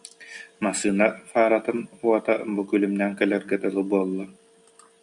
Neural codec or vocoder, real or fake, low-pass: none; real; 10.8 kHz